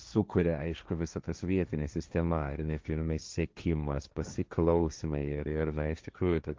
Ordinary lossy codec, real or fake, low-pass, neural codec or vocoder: Opus, 24 kbps; fake; 7.2 kHz; codec, 16 kHz, 1.1 kbps, Voila-Tokenizer